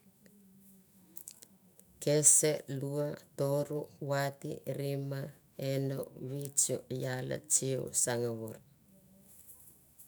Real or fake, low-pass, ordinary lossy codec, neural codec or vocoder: fake; none; none; autoencoder, 48 kHz, 128 numbers a frame, DAC-VAE, trained on Japanese speech